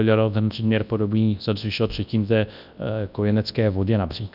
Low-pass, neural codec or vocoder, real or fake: 5.4 kHz; codec, 24 kHz, 0.9 kbps, WavTokenizer, large speech release; fake